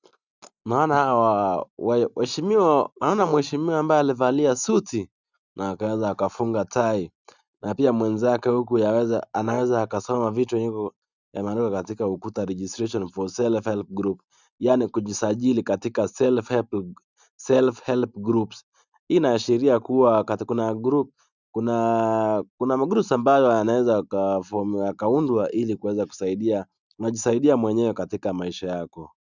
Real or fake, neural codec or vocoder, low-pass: real; none; 7.2 kHz